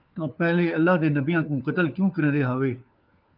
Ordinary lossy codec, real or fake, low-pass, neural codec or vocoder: Opus, 24 kbps; fake; 5.4 kHz; codec, 16 kHz, 4 kbps, FunCodec, trained on LibriTTS, 50 frames a second